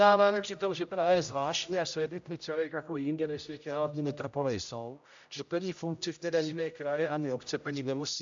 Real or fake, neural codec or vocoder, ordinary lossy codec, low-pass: fake; codec, 16 kHz, 0.5 kbps, X-Codec, HuBERT features, trained on general audio; AAC, 64 kbps; 7.2 kHz